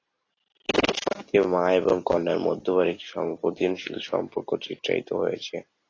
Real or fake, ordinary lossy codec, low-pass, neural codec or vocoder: real; AAC, 32 kbps; 7.2 kHz; none